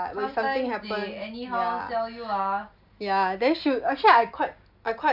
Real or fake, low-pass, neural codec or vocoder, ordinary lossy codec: real; 5.4 kHz; none; none